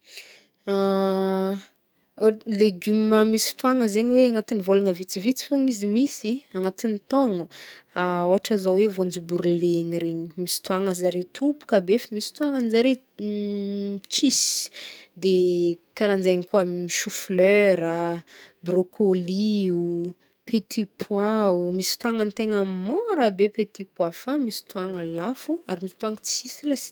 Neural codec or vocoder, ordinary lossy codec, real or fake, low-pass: codec, 44.1 kHz, 2.6 kbps, SNAC; none; fake; none